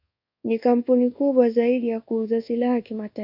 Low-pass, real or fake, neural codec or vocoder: 5.4 kHz; fake; codec, 24 kHz, 1.2 kbps, DualCodec